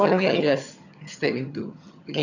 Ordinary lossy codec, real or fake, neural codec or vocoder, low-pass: none; fake; vocoder, 22.05 kHz, 80 mel bands, HiFi-GAN; 7.2 kHz